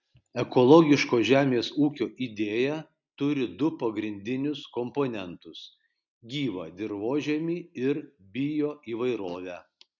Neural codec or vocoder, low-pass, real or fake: none; 7.2 kHz; real